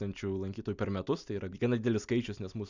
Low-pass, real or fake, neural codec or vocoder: 7.2 kHz; real; none